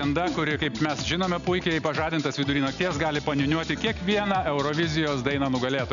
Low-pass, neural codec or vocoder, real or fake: 7.2 kHz; none; real